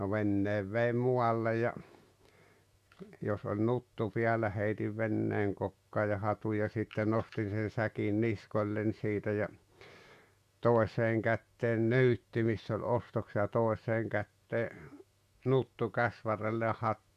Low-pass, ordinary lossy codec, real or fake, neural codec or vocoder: 14.4 kHz; Opus, 64 kbps; fake; autoencoder, 48 kHz, 128 numbers a frame, DAC-VAE, trained on Japanese speech